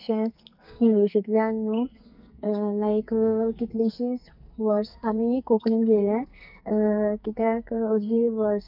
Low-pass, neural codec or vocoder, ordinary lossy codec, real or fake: 5.4 kHz; codec, 44.1 kHz, 2.6 kbps, SNAC; none; fake